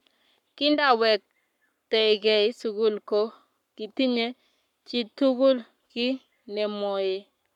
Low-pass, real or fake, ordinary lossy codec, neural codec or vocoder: 19.8 kHz; fake; none; codec, 44.1 kHz, 7.8 kbps, Pupu-Codec